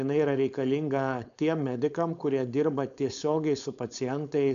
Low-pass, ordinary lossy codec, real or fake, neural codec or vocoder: 7.2 kHz; AAC, 96 kbps; fake; codec, 16 kHz, 4.8 kbps, FACodec